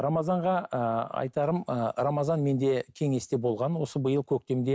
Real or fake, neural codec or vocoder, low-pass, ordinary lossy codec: real; none; none; none